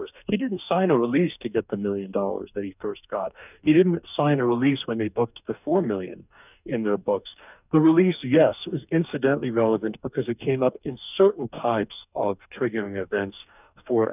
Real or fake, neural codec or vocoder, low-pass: fake; codec, 44.1 kHz, 2.6 kbps, DAC; 3.6 kHz